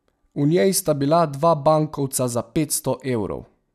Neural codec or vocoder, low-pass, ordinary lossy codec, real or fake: none; 14.4 kHz; none; real